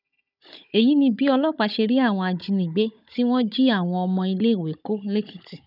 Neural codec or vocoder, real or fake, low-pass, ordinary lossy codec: codec, 16 kHz, 16 kbps, FunCodec, trained on Chinese and English, 50 frames a second; fake; 5.4 kHz; none